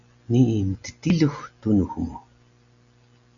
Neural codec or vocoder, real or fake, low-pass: none; real; 7.2 kHz